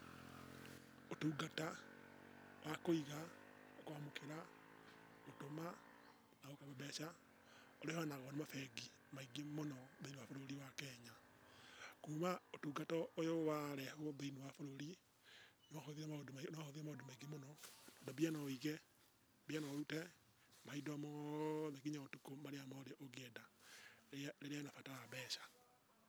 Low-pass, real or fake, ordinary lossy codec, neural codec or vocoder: none; real; none; none